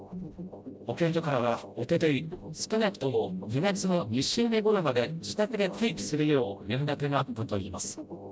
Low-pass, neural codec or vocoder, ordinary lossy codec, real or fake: none; codec, 16 kHz, 0.5 kbps, FreqCodec, smaller model; none; fake